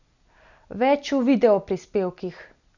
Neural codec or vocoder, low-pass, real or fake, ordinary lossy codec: none; 7.2 kHz; real; none